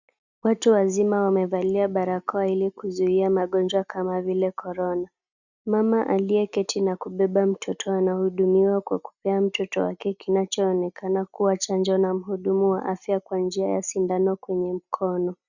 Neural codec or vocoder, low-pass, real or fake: none; 7.2 kHz; real